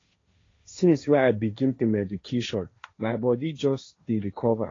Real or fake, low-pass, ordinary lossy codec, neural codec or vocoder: fake; 7.2 kHz; AAC, 32 kbps; codec, 16 kHz, 1.1 kbps, Voila-Tokenizer